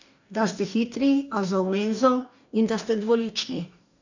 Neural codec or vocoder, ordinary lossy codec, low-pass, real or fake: codec, 44.1 kHz, 2.6 kbps, DAC; none; 7.2 kHz; fake